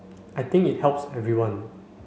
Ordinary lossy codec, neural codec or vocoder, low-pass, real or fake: none; none; none; real